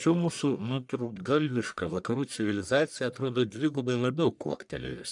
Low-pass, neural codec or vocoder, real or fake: 10.8 kHz; codec, 44.1 kHz, 1.7 kbps, Pupu-Codec; fake